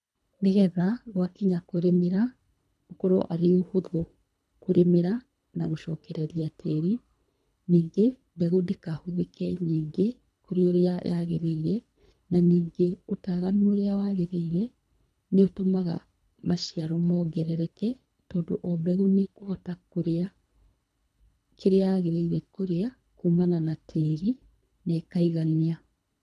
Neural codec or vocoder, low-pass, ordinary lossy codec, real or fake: codec, 24 kHz, 3 kbps, HILCodec; none; none; fake